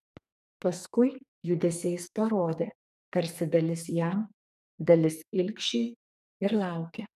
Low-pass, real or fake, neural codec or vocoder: 14.4 kHz; fake; codec, 44.1 kHz, 2.6 kbps, SNAC